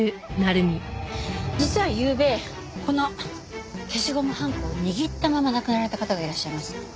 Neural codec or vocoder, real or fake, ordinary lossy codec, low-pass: none; real; none; none